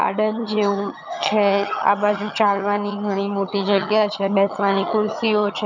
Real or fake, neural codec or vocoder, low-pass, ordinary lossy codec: fake; vocoder, 22.05 kHz, 80 mel bands, HiFi-GAN; 7.2 kHz; none